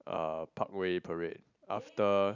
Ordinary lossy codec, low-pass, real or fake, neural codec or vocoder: none; 7.2 kHz; real; none